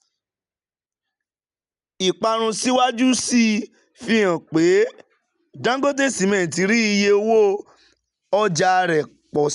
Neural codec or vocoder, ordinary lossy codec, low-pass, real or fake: none; MP3, 96 kbps; 10.8 kHz; real